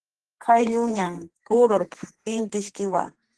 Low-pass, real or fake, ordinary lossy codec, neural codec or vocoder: 10.8 kHz; fake; Opus, 16 kbps; codec, 32 kHz, 1.9 kbps, SNAC